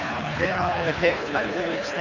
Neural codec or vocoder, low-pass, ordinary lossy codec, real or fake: codec, 24 kHz, 3 kbps, HILCodec; 7.2 kHz; none; fake